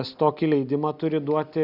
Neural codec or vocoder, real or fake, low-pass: none; real; 5.4 kHz